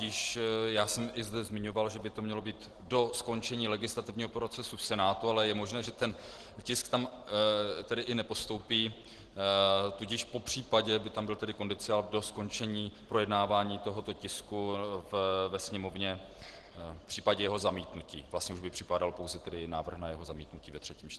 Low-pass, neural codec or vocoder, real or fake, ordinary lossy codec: 10.8 kHz; none; real; Opus, 16 kbps